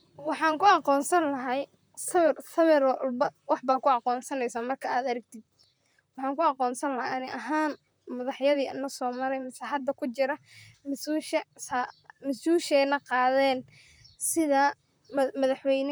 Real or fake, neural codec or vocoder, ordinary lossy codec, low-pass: fake; vocoder, 44.1 kHz, 128 mel bands, Pupu-Vocoder; none; none